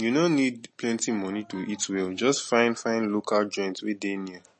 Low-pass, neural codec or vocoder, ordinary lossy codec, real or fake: 10.8 kHz; none; MP3, 32 kbps; real